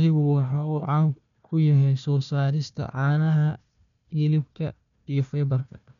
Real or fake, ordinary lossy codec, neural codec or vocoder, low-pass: fake; MP3, 96 kbps; codec, 16 kHz, 1 kbps, FunCodec, trained on Chinese and English, 50 frames a second; 7.2 kHz